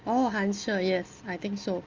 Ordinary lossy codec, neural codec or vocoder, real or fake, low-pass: Opus, 32 kbps; none; real; 7.2 kHz